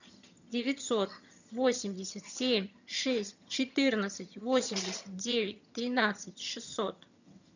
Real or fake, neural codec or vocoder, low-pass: fake; vocoder, 22.05 kHz, 80 mel bands, HiFi-GAN; 7.2 kHz